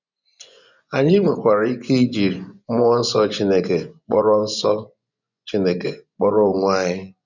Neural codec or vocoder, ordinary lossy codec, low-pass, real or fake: vocoder, 24 kHz, 100 mel bands, Vocos; none; 7.2 kHz; fake